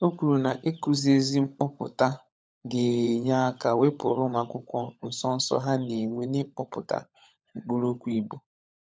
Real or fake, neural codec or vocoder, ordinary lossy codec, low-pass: fake; codec, 16 kHz, 16 kbps, FunCodec, trained on LibriTTS, 50 frames a second; none; none